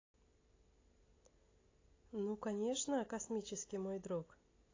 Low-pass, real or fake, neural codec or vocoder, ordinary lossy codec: 7.2 kHz; real; none; AAC, 32 kbps